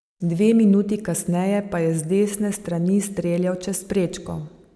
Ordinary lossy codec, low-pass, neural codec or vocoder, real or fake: none; none; none; real